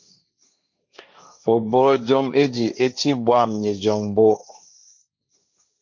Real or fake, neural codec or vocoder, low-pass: fake; codec, 16 kHz, 1.1 kbps, Voila-Tokenizer; 7.2 kHz